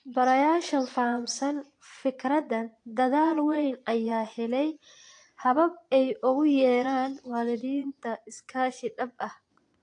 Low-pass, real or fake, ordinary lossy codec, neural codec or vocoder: 9.9 kHz; fake; AAC, 48 kbps; vocoder, 22.05 kHz, 80 mel bands, Vocos